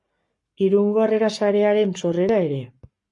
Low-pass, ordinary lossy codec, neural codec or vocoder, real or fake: 10.8 kHz; MP3, 48 kbps; codec, 44.1 kHz, 7.8 kbps, Pupu-Codec; fake